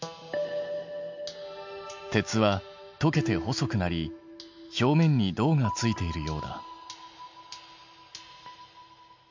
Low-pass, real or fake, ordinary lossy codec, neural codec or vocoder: 7.2 kHz; real; MP3, 64 kbps; none